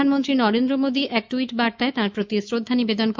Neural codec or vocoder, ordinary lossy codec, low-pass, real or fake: autoencoder, 48 kHz, 128 numbers a frame, DAC-VAE, trained on Japanese speech; MP3, 64 kbps; 7.2 kHz; fake